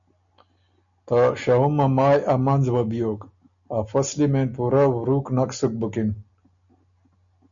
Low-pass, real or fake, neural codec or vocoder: 7.2 kHz; real; none